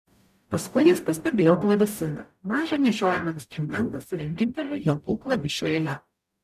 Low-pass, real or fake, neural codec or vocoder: 14.4 kHz; fake; codec, 44.1 kHz, 0.9 kbps, DAC